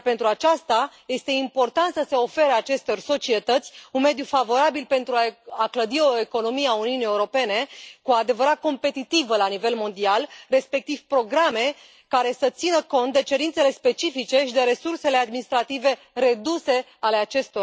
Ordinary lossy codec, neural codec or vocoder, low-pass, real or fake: none; none; none; real